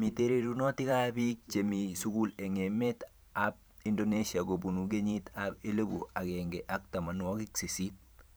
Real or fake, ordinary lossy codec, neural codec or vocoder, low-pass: fake; none; vocoder, 44.1 kHz, 128 mel bands every 512 samples, BigVGAN v2; none